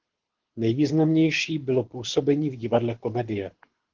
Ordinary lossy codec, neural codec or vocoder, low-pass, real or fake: Opus, 16 kbps; codec, 24 kHz, 6 kbps, HILCodec; 7.2 kHz; fake